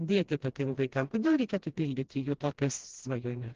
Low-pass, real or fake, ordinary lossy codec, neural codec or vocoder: 7.2 kHz; fake; Opus, 16 kbps; codec, 16 kHz, 1 kbps, FreqCodec, smaller model